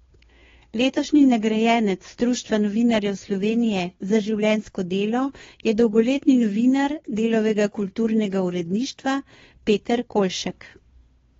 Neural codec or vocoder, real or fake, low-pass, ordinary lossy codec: codec, 16 kHz, 2 kbps, FunCodec, trained on Chinese and English, 25 frames a second; fake; 7.2 kHz; AAC, 24 kbps